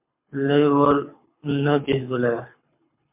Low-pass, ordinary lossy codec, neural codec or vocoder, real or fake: 3.6 kHz; MP3, 32 kbps; codec, 24 kHz, 6 kbps, HILCodec; fake